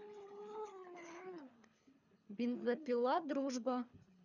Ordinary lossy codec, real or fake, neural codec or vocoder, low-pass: none; fake; codec, 24 kHz, 3 kbps, HILCodec; 7.2 kHz